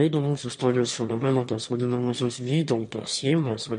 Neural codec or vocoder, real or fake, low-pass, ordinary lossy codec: autoencoder, 22.05 kHz, a latent of 192 numbers a frame, VITS, trained on one speaker; fake; 9.9 kHz; MP3, 48 kbps